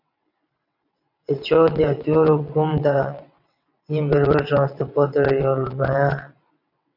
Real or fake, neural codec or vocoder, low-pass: fake; vocoder, 22.05 kHz, 80 mel bands, WaveNeXt; 5.4 kHz